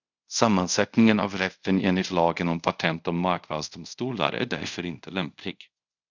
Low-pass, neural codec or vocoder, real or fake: 7.2 kHz; codec, 24 kHz, 0.5 kbps, DualCodec; fake